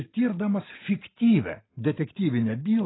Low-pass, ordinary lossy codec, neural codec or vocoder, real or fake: 7.2 kHz; AAC, 16 kbps; none; real